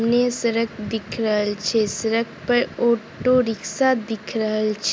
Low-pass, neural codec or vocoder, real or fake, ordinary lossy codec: none; none; real; none